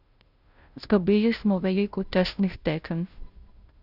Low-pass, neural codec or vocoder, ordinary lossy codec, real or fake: 5.4 kHz; codec, 16 kHz, 0.5 kbps, FunCodec, trained on Chinese and English, 25 frames a second; none; fake